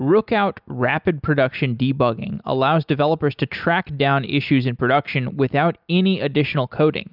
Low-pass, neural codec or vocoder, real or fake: 5.4 kHz; none; real